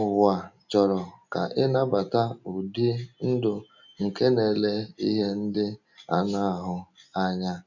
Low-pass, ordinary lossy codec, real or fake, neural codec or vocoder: 7.2 kHz; none; real; none